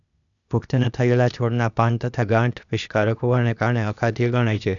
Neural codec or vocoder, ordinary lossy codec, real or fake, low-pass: codec, 16 kHz, 0.8 kbps, ZipCodec; none; fake; 7.2 kHz